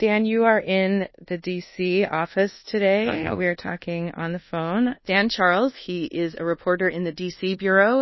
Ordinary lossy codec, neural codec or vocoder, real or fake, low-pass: MP3, 24 kbps; codec, 24 kHz, 1.2 kbps, DualCodec; fake; 7.2 kHz